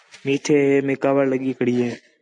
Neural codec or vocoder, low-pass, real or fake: none; 10.8 kHz; real